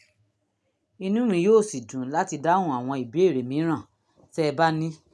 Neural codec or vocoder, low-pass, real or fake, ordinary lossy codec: none; none; real; none